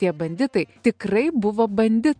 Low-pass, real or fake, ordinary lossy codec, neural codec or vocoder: 9.9 kHz; real; MP3, 96 kbps; none